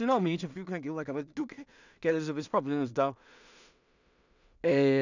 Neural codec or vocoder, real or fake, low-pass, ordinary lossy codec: codec, 16 kHz in and 24 kHz out, 0.4 kbps, LongCat-Audio-Codec, two codebook decoder; fake; 7.2 kHz; none